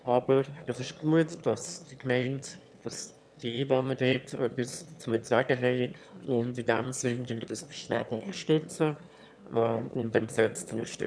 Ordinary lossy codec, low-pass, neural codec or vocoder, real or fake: none; none; autoencoder, 22.05 kHz, a latent of 192 numbers a frame, VITS, trained on one speaker; fake